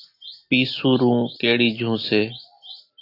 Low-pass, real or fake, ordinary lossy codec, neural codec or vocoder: 5.4 kHz; real; AAC, 32 kbps; none